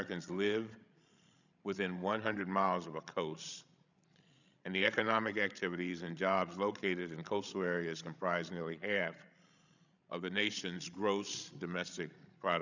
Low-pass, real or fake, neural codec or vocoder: 7.2 kHz; fake; codec, 16 kHz, 16 kbps, FreqCodec, larger model